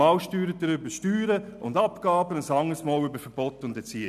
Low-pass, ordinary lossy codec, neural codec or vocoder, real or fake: 14.4 kHz; none; none; real